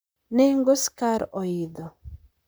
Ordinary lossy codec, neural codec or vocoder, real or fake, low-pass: none; vocoder, 44.1 kHz, 128 mel bands, Pupu-Vocoder; fake; none